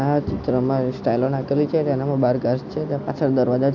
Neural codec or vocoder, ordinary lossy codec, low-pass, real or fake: none; none; 7.2 kHz; real